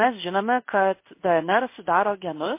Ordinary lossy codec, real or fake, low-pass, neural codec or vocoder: MP3, 24 kbps; fake; 3.6 kHz; codec, 16 kHz in and 24 kHz out, 1 kbps, XY-Tokenizer